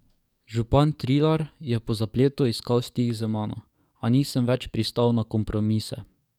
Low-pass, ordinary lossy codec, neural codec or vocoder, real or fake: 19.8 kHz; none; codec, 44.1 kHz, 7.8 kbps, DAC; fake